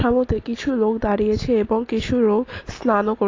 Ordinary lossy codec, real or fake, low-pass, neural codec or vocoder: AAC, 32 kbps; real; 7.2 kHz; none